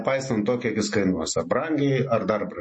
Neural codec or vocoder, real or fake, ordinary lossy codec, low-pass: none; real; MP3, 32 kbps; 9.9 kHz